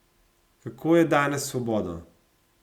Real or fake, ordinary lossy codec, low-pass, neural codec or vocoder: real; Opus, 64 kbps; 19.8 kHz; none